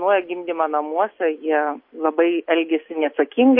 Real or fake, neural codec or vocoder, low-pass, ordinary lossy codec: real; none; 5.4 kHz; MP3, 32 kbps